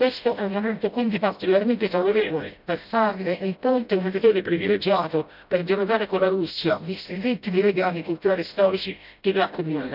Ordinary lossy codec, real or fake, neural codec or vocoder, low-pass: none; fake; codec, 16 kHz, 0.5 kbps, FreqCodec, smaller model; 5.4 kHz